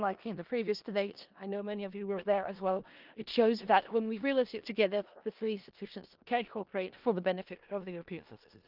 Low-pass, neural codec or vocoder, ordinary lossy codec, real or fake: 5.4 kHz; codec, 16 kHz in and 24 kHz out, 0.4 kbps, LongCat-Audio-Codec, four codebook decoder; Opus, 32 kbps; fake